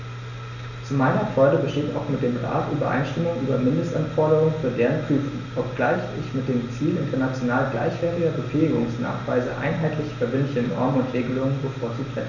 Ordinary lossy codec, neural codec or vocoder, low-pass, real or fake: none; none; 7.2 kHz; real